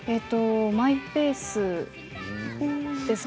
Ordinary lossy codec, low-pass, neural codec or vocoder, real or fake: none; none; none; real